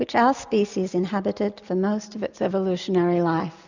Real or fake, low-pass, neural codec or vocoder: real; 7.2 kHz; none